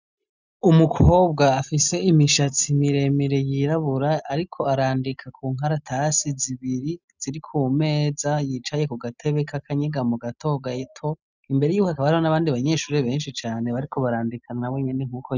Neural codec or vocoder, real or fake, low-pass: none; real; 7.2 kHz